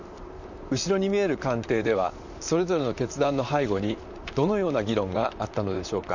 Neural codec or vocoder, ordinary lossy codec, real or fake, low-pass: vocoder, 44.1 kHz, 128 mel bands, Pupu-Vocoder; none; fake; 7.2 kHz